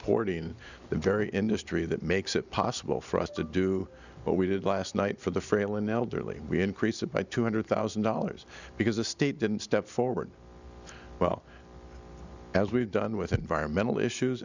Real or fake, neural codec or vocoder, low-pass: real; none; 7.2 kHz